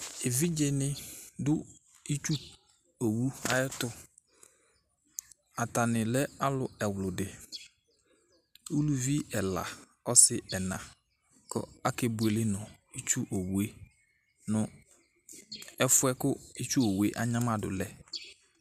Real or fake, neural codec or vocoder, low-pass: real; none; 14.4 kHz